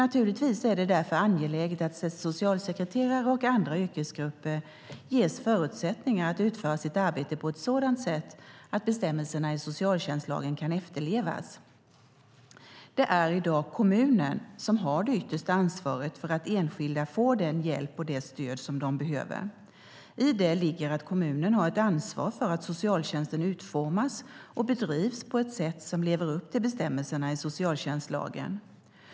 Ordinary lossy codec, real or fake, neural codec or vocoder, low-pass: none; real; none; none